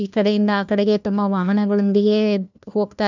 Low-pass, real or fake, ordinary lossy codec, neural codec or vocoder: 7.2 kHz; fake; none; codec, 16 kHz, 1 kbps, FunCodec, trained on LibriTTS, 50 frames a second